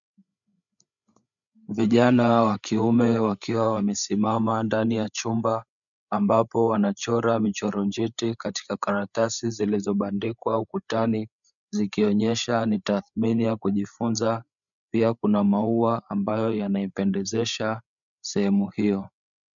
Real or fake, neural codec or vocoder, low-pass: fake; codec, 16 kHz, 8 kbps, FreqCodec, larger model; 7.2 kHz